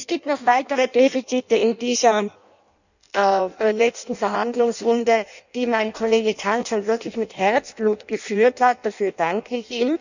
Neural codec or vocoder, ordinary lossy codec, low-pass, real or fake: codec, 16 kHz in and 24 kHz out, 0.6 kbps, FireRedTTS-2 codec; none; 7.2 kHz; fake